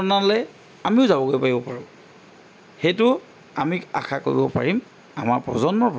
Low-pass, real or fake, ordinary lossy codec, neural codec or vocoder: none; real; none; none